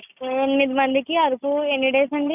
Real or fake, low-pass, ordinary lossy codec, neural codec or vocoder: real; 3.6 kHz; none; none